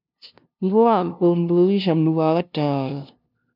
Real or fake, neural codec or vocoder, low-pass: fake; codec, 16 kHz, 0.5 kbps, FunCodec, trained on LibriTTS, 25 frames a second; 5.4 kHz